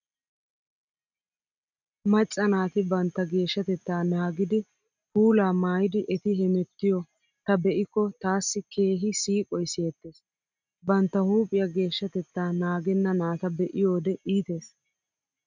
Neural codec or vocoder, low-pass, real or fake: none; 7.2 kHz; real